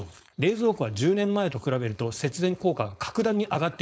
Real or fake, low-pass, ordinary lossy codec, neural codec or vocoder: fake; none; none; codec, 16 kHz, 4.8 kbps, FACodec